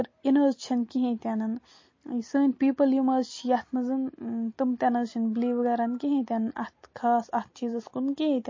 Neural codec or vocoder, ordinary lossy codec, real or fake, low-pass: none; MP3, 32 kbps; real; 7.2 kHz